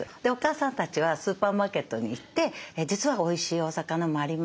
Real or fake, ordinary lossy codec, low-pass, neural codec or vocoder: real; none; none; none